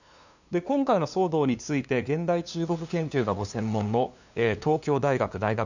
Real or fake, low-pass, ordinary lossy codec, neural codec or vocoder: fake; 7.2 kHz; none; codec, 16 kHz, 2 kbps, FunCodec, trained on LibriTTS, 25 frames a second